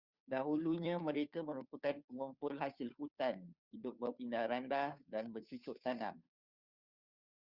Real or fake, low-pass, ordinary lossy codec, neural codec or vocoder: fake; 5.4 kHz; Opus, 64 kbps; codec, 16 kHz, 2 kbps, FunCodec, trained on Chinese and English, 25 frames a second